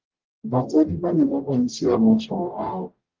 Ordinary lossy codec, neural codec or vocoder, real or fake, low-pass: Opus, 24 kbps; codec, 44.1 kHz, 0.9 kbps, DAC; fake; 7.2 kHz